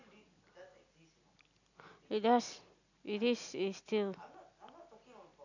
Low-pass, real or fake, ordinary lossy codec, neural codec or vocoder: 7.2 kHz; real; none; none